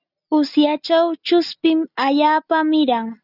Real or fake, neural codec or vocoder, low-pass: real; none; 5.4 kHz